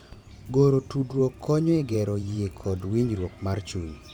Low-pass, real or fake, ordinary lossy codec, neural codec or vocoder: 19.8 kHz; fake; none; vocoder, 44.1 kHz, 128 mel bands every 512 samples, BigVGAN v2